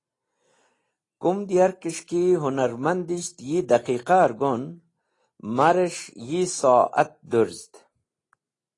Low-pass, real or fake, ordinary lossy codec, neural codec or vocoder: 10.8 kHz; real; AAC, 32 kbps; none